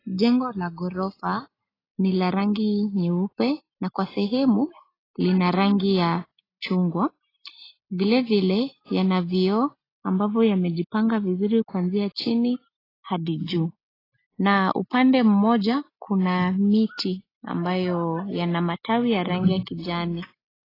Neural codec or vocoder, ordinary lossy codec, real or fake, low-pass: none; AAC, 24 kbps; real; 5.4 kHz